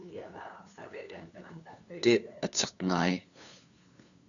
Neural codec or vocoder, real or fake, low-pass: codec, 16 kHz, 2 kbps, FunCodec, trained on LibriTTS, 25 frames a second; fake; 7.2 kHz